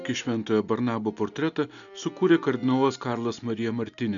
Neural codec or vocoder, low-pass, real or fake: none; 7.2 kHz; real